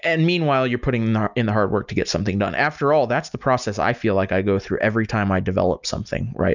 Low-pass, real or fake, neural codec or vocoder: 7.2 kHz; real; none